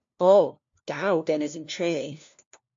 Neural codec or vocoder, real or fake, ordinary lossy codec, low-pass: codec, 16 kHz, 1 kbps, FunCodec, trained on LibriTTS, 50 frames a second; fake; MP3, 48 kbps; 7.2 kHz